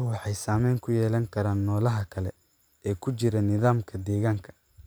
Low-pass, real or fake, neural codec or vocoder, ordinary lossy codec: none; fake; vocoder, 44.1 kHz, 128 mel bands every 512 samples, BigVGAN v2; none